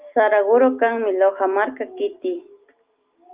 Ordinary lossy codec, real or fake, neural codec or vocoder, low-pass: Opus, 24 kbps; real; none; 3.6 kHz